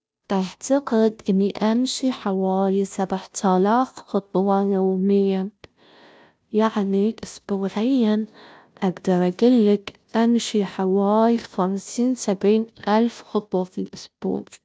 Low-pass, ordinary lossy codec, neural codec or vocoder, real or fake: none; none; codec, 16 kHz, 0.5 kbps, FunCodec, trained on Chinese and English, 25 frames a second; fake